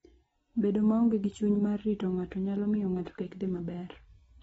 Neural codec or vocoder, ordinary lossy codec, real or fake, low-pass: none; AAC, 24 kbps; real; 19.8 kHz